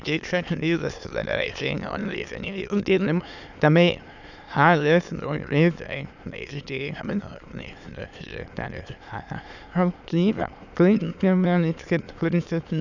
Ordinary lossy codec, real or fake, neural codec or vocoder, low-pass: none; fake; autoencoder, 22.05 kHz, a latent of 192 numbers a frame, VITS, trained on many speakers; 7.2 kHz